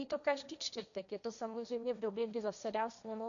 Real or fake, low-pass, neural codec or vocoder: fake; 7.2 kHz; codec, 16 kHz, 1.1 kbps, Voila-Tokenizer